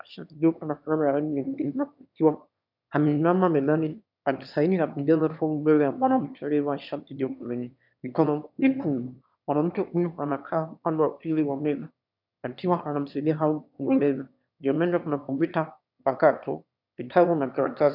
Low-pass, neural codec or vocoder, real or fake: 5.4 kHz; autoencoder, 22.05 kHz, a latent of 192 numbers a frame, VITS, trained on one speaker; fake